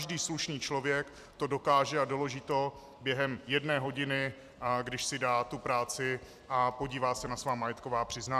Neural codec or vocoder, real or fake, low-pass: vocoder, 44.1 kHz, 128 mel bands every 256 samples, BigVGAN v2; fake; 14.4 kHz